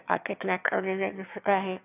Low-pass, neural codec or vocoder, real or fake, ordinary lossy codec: 3.6 kHz; autoencoder, 22.05 kHz, a latent of 192 numbers a frame, VITS, trained on one speaker; fake; none